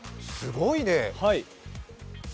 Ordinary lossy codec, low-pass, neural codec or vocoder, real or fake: none; none; none; real